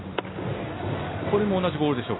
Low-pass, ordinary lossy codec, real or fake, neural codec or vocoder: 7.2 kHz; AAC, 16 kbps; real; none